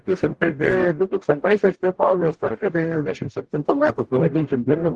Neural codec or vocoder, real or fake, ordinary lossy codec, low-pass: codec, 44.1 kHz, 0.9 kbps, DAC; fake; Opus, 16 kbps; 10.8 kHz